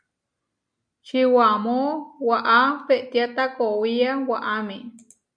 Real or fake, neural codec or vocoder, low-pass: real; none; 9.9 kHz